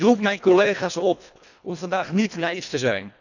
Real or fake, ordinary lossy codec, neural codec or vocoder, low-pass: fake; none; codec, 24 kHz, 1.5 kbps, HILCodec; 7.2 kHz